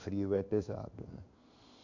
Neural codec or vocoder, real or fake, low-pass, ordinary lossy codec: codec, 16 kHz in and 24 kHz out, 1 kbps, XY-Tokenizer; fake; 7.2 kHz; Opus, 64 kbps